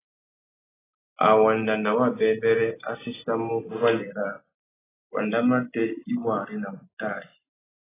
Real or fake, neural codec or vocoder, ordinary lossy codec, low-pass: real; none; AAC, 16 kbps; 3.6 kHz